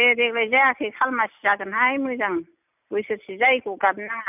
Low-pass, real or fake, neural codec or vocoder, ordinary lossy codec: 3.6 kHz; real; none; none